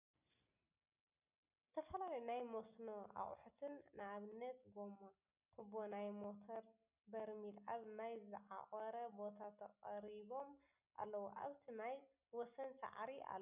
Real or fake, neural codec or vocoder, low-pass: real; none; 3.6 kHz